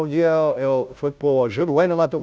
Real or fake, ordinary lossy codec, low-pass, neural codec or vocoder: fake; none; none; codec, 16 kHz, 0.5 kbps, FunCodec, trained on Chinese and English, 25 frames a second